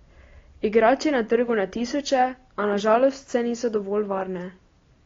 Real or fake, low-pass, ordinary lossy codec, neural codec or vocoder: real; 7.2 kHz; AAC, 32 kbps; none